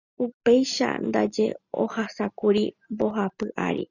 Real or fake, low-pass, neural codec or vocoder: real; 7.2 kHz; none